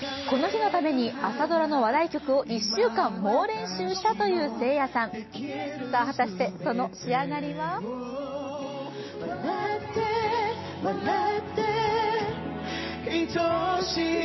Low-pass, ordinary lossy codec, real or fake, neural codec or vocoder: 7.2 kHz; MP3, 24 kbps; real; none